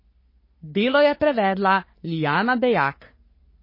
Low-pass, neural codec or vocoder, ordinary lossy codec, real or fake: 5.4 kHz; codec, 44.1 kHz, 3.4 kbps, Pupu-Codec; MP3, 24 kbps; fake